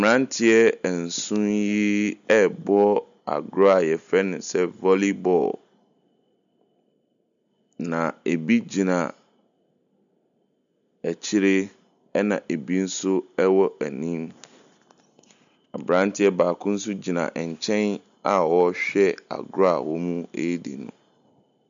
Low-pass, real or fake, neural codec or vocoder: 7.2 kHz; real; none